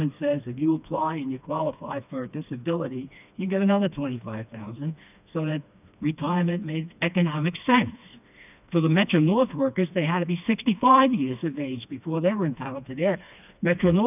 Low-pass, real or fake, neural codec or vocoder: 3.6 kHz; fake; codec, 16 kHz, 2 kbps, FreqCodec, smaller model